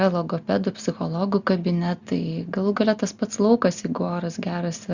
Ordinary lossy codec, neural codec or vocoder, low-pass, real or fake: Opus, 64 kbps; none; 7.2 kHz; real